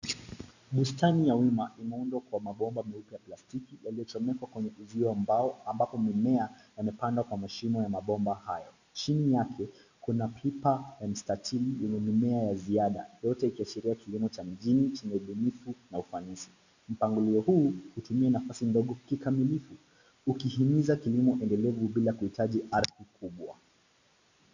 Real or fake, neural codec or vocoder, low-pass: real; none; 7.2 kHz